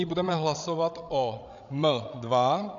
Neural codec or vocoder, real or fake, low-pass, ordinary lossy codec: codec, 16 kHz, 16 kbps, FreqCodec, larger model; fake; 7.2 kHz; MP3, 96 kbps